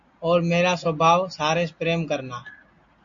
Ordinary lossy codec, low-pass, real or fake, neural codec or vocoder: AAC, 64 kbps; 7.2 kHz; real; none